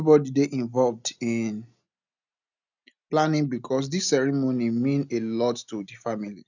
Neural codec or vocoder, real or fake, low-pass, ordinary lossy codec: none; real; 7.2 kHz; none